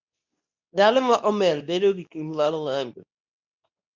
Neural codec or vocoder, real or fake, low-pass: codec, 24 kHz, 0.9 kbps, WavTokenizer, medium speech release version 2; fake; 7.2 kHz